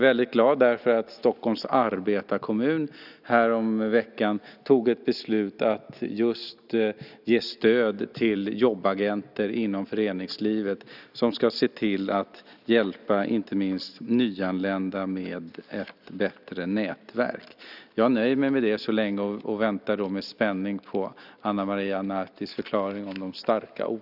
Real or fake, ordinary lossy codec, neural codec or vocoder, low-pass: real; none; none; 5.4 kHz